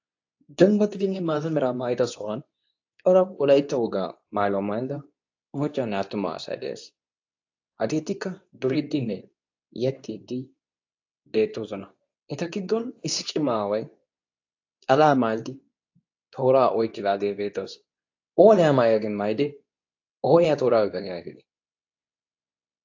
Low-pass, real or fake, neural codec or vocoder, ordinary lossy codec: 7.2 kHz; fake; codec, 24 kHz, 0.9 kbps, WavTokenizer, medium speech release version 2; AAC, 48 kbps